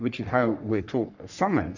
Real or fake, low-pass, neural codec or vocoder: fake; 7.2 kHz; codec, 44.1 kHz, 3.4 kbps, Pupu-Codec